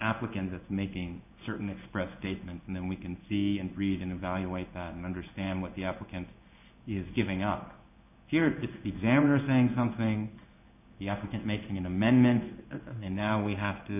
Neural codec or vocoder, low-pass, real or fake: codec, 16 kHz in and 24 kHz out, 1 kbps, XY-Tokenizer; 3.6 kHz; fake